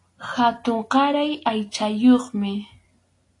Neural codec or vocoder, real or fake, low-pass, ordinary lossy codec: none; real; 10.8 kHz; AAC, 48 kbps